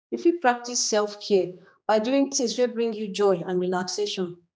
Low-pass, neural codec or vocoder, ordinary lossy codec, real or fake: none; codec, 16 kHz, 2 kbps, X-Codec, HuBERT features, trained on general audio; none; fake